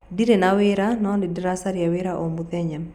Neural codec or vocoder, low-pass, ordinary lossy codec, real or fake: none; 19.8 kHz; none; real